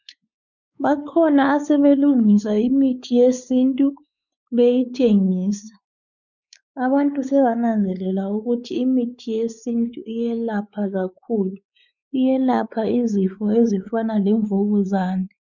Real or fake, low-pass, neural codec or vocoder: fake; 7.2 kHz; codec, 16 kHz, 4 kbps, X-Codec, WavLM features, trained on Multilingual LibriSpeech